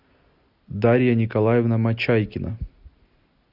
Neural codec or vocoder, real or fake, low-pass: none; real; 5.4 kHz